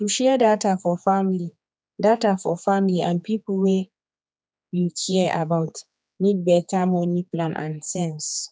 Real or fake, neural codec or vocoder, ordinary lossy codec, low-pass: fake; codec, 16 kHz, 4 kbps, X-Codec, HuBERT features, trained on general audio; none; none